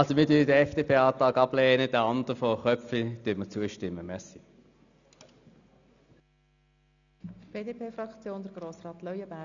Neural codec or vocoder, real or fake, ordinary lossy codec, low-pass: none; real; none; 7.2 kHz